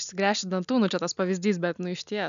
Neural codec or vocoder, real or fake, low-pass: none; real; 7.2 kHz